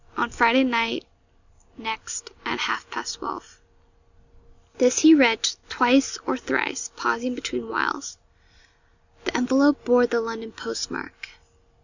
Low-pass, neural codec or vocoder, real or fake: 7.2 kHz; none; real